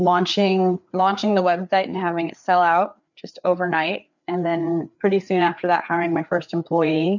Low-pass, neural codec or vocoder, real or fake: 7.2 kHz; codec, 16 kHz, 4 kbps, FreqCodec, larger model; fake